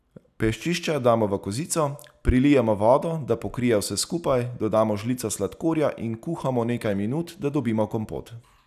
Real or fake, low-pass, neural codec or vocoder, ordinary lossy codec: real; 14.4 kHz; none; none